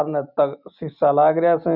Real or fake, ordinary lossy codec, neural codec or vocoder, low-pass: real; none; none; 5.4 kHz